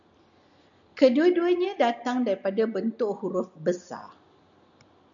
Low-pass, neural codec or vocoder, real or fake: 7.2 kHz; none; real